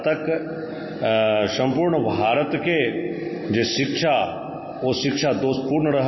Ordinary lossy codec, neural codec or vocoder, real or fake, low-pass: MP3, 24 kbps; none; real; 7.2 kHz